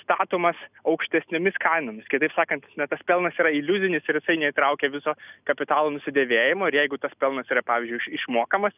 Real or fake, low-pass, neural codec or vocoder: real; 3.6 kHz; none